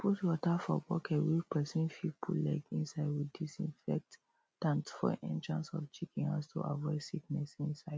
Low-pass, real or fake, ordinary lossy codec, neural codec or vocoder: none; real; none; none